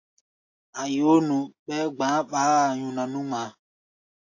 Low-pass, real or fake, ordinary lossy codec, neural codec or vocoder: 7.2 kHz; real; AAC, 48 kbps; none